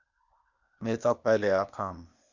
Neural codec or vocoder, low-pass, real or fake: codec, 16 kHz, 0.8 kbps, ZipCodec; 7.2 kHz; fake